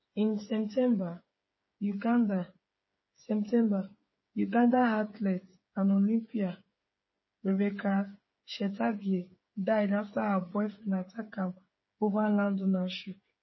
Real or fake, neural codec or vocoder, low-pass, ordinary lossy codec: fake; codec, 16 kHz, 8 kbps, FreqCodec, smaller model; 7.2 kHz; MP3, 24 kbps